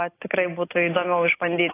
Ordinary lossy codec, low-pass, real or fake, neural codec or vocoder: AAC, 24 kbps; 3.6 kHz; real; none